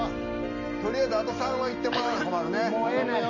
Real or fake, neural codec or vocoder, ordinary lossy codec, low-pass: real; none; none; 7.2 kHz